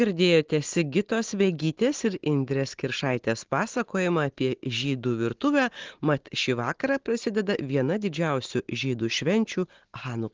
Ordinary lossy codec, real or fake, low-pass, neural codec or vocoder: Opus, 16 kbps; real; 7.2 kHz; none